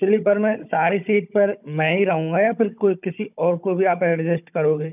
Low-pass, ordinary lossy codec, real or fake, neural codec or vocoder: 3.6 kHz; none; fake; codec, 16 kHz, 16 kbps, FunCodec, trained on Chinese and English, 50 frames a second